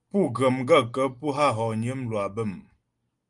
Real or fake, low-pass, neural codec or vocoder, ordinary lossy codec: real; 10.8 kHz; none; Opus, 32 kbps